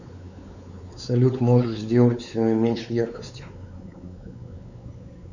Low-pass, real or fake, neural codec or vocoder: 7.2 kHz; fake; codec, 16 kHz, 4 kbps, X-Codec, WavLM features, trained on Multilingual LibriSpeech